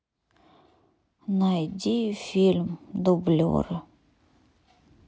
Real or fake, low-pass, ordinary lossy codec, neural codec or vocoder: real; none; none; none